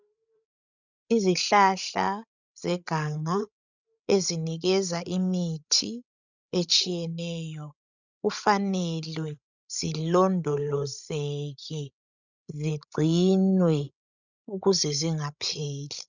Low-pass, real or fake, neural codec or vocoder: 7.2 kHz; fake; codec, 16 kHz, 16 kbps, FreqCodec, larger model